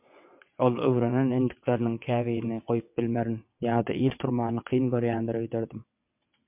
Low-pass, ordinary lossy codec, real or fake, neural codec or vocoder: 3.6 kHz; MP3, 32 kbps; fake; vocoder, 22.05 kHz, 80 mel bands, WaveNeXt